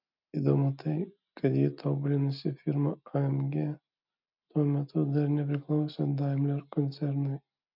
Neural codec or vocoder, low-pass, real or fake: none; 5.4 kHz; real